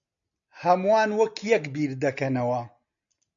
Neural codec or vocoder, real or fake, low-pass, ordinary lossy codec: none; real; 7.2 kHz; MP3, 96 kbps